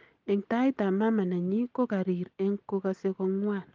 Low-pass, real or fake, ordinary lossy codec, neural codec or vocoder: 7.2 kHz; real; Opus, 24 kbps; none